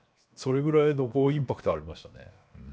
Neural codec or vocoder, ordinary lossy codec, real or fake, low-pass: codec, 16 kHz, 0.7 kbps, FocalCodec; none; fake; none